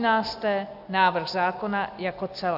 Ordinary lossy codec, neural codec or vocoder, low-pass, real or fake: AAC, 48 kbps; none; 5.4 kHz; real